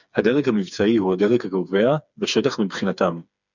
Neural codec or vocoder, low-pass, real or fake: codec, 16 kHz, 4 kbps, FreqCodec, smaller model; 7.2 kHz; fake